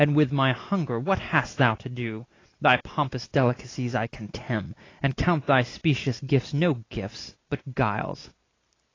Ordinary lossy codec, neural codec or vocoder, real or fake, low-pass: AAC, 32 kbps; none; real; 7.2 kHz